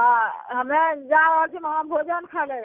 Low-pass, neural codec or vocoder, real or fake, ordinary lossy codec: 3.6 kHz; none; real; none